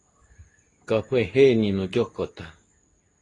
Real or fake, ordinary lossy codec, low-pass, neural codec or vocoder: fake; AAC, 32 kbps; 10.8 kHz; codec, 24 kHz, 0.9 kbps, WavTokenizer, medium speech release version 2